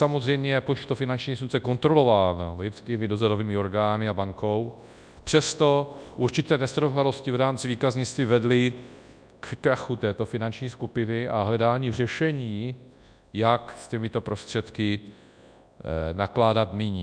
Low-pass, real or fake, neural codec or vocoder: 9.9 kHz; fake; codec, 24 kHz, 0.9 kbps, WavTokenizer, large speech release